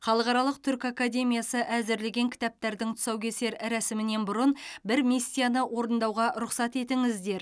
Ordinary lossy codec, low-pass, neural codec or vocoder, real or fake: none; none; none; real